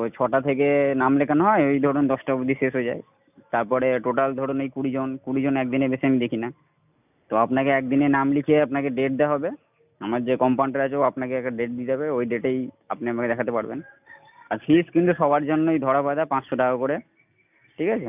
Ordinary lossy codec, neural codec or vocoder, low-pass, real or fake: none; none; 3.6 kHz; real